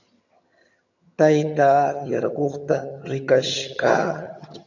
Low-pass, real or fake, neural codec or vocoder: 7.2 kHz; fake; vocoder, 22.05 kHz, 80 mel bands, HiFi-GAN